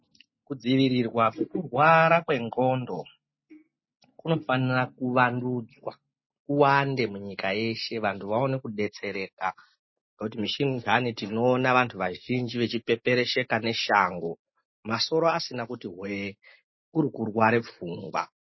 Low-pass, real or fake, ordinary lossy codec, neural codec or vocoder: 7.2 kHz; real; MP3, 24 kbps; none